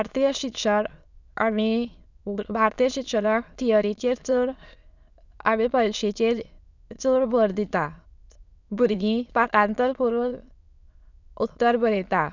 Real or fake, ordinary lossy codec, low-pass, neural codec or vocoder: fake; none; 7.2 kHz; autoencoder, 22.05 kHz, a latent of 192 numbers a frame, VITS, trained on many speakers